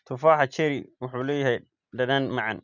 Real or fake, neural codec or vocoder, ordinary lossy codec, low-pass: real; none; none; 7.2 kHz